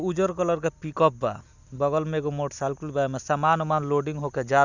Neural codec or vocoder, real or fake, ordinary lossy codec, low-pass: none; real; none; 7.2 kHz